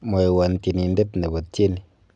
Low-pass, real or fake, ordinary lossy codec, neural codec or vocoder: 10.8 kHz; real; none; none